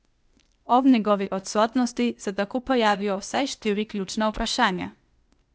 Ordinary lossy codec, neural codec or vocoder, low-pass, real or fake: none; codec, 16 kHz, 0.8 kbps, ZipCodec; none; fake